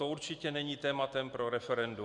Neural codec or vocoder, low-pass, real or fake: none; 10.8 kHz; real